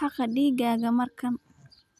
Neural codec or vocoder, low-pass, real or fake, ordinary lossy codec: none; 14.4 kHz; real; none